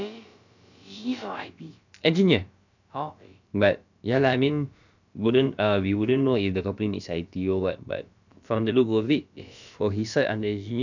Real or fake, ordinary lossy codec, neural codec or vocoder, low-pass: fake; none; codec, 16 kHz, about 1 kbps, DyCAST, with the encoder's durations; 7.2 kHz